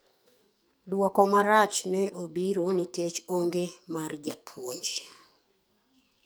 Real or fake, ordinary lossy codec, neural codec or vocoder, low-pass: fake; none; codec, 44.1 kHz, 2.6 kbps, SNAC; none